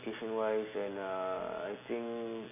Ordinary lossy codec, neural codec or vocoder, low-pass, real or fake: none; none; 3.6 kHz; real